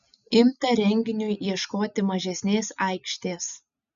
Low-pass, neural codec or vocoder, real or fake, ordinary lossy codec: 7.2 kHz; none; real; MP3, 96 kbps